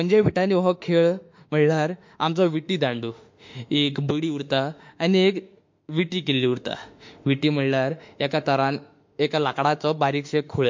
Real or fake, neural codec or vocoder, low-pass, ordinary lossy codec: fake; autoencoder, 48 kHz, 32 numbers a frame, DAC-VAE, trained on Japanese speech; 7.2 kHz; MP3, 48 kbps